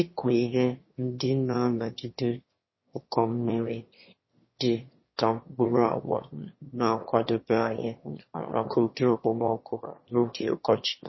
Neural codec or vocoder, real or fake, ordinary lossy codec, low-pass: autoencoder, 22.05 kHz, a latent of 192 numbers a frame, VITS, trained on one speaker; fake; MP3, 24 kbps; 7.2 kHz